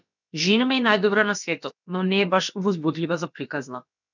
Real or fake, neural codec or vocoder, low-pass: fake; codec, 16 kHz, about 1 kbps, DyCAST, with the encoder's durations; 7.2 kHz